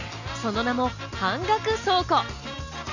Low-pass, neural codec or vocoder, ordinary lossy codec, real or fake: 7.2 kHz; none; none; real